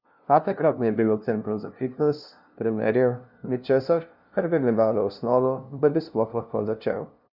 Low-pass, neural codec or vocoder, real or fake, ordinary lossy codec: 5.4 kHz; codec, 16 kHz, 0.5 kbps, FunCodec, trained on LibriTTS, 25 frames a second; fake; none